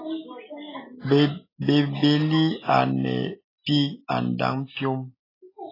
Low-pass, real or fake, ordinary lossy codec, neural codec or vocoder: 5.4 kHz; real; AAC, 24 kbps; none